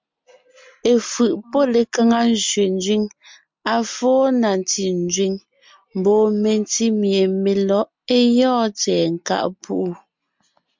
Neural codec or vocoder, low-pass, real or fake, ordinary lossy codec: none; 7.2 kHz; real; MP3, 64 kbps